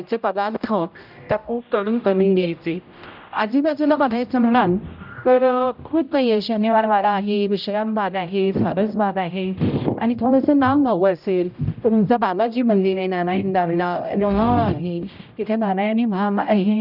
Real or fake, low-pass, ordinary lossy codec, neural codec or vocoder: fake; 5.4 kHz; none; codec, 16 kHz, 0.5 kbps, X-Codec, HuBERT features, trained on general audio